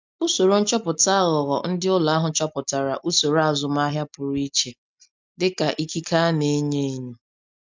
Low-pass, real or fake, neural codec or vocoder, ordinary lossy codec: 7.2 kHz; real; none; MP3, 64 kbps